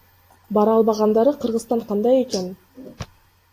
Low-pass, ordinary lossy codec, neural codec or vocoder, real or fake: 14.4 kHz; AAC, 64 kbps; none; real